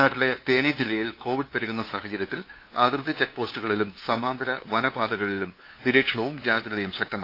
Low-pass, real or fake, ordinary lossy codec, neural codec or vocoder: 5.4 kHz; fake; AAC, 32 kbps; codec, 16 kHz, 2 kbps, FunCodec, trained on LibriTTS, 25 frames a second